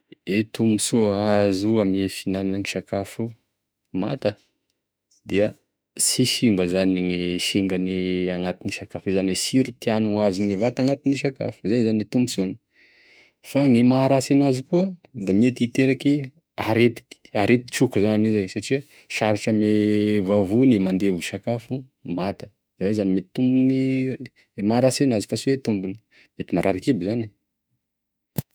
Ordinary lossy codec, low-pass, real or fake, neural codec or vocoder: none; none; fake; autoencoder, 48 kHz, 32 numbers a frame, DAC-VAE, trained on Japanese speech